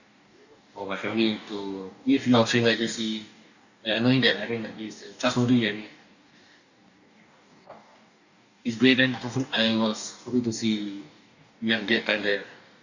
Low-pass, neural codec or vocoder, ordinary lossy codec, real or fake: 7.2 kHz; codec, 44.1 kHz, 2.6 kbps, DAC; none; fake